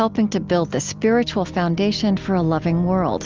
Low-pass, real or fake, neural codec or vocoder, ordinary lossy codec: 7.2 kHz; real; none; Opus, 32 kbps